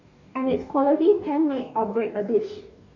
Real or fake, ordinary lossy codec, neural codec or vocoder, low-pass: fake; none; codec, 44.1 kHz, 2.6 kbps, DAC; 7.2 kHz